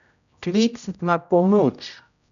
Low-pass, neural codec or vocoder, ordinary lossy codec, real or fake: 7.2 kHz; codec, 16 kHz, 0.5 kbps, X-Codec, HuBERT features, trained on general audio; none; fake